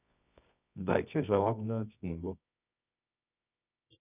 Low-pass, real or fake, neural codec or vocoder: 3.6 kHz; fake; codec, 24 kHz, 0.9 kbps, WavTokenizer, medium music audio release